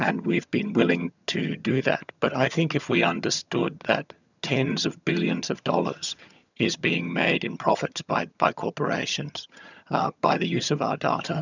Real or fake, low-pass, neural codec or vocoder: fake; 7.2 kHz; vocoder, 22.05 kHz, 80 mel bands, HiFi-GAN